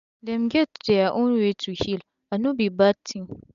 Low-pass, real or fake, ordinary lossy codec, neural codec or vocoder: 7.2 kHz; real; none; none